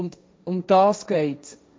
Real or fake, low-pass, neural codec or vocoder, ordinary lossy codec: fake; none; codec, 16 kHz, 1.1 kbps, Voila-Tokenizer; none